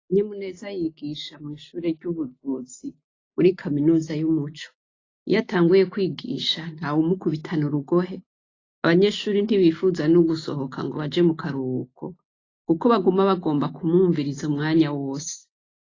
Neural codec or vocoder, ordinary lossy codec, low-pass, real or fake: none; AAC, 32 kbps; 7.2 kHz; real